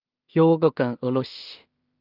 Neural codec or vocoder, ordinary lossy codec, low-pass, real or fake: codec, 16 kHz in and 24 kHz out, 0.4 kbps, LongCat-Audio-Codec, two codebook decoder; Opus, 24 kbps; 5.4 kHz; fake